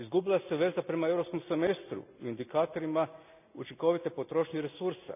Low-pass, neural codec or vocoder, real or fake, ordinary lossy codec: 3.6 kHz; none; real; none